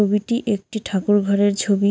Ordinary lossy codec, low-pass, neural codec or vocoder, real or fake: none; none; none; real